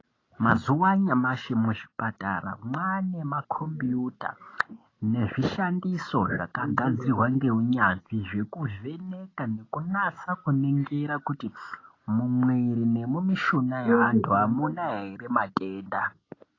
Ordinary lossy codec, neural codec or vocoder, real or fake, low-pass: AAC, 32 kbps; none; real; 7.2 kHz